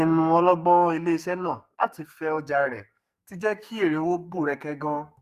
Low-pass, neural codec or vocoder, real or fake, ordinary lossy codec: 14.4 kHz; codec, 44.1 kHz, 2.6 kbps, SNAC; fake; Opus, 64 kbps